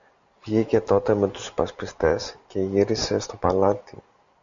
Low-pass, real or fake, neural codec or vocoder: 7.2 kHz; real; none